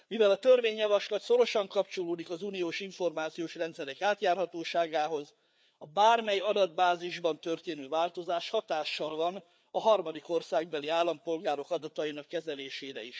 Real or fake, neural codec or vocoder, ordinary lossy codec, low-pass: fake; codec, 16 kHz, 4 kbps, FreqCodec, larger model; none; none